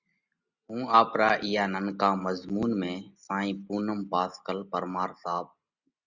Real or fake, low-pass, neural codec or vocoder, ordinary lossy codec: real; 7.2 kHz; none; Opus, 64 kbps